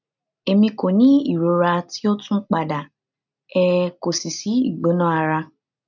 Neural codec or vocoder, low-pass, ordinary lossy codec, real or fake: none; 7.2 kHz; none; real